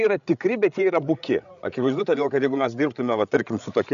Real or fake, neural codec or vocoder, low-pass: fake; codec, 16 kHz, 8 kbps, FreqCodec, larger model; 7.2 kHz